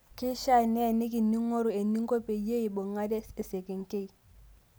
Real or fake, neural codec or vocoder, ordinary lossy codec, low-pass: real; none; none; none